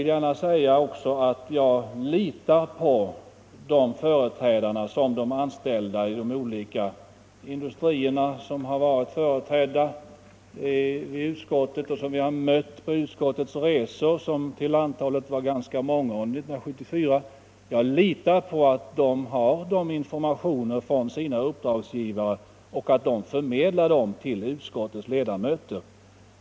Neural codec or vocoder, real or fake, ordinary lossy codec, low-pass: none; real; none; none